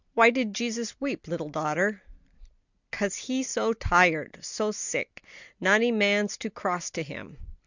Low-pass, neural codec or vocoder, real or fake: 7.2 kHz; none; real